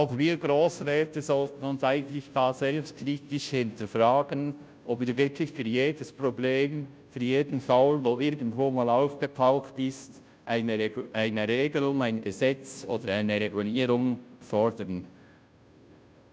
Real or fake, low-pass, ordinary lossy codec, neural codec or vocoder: fake; none; none; codec, 16 kHz, 0.5 kbps, FunCodec, trained on Chinese and English, 25 frames a second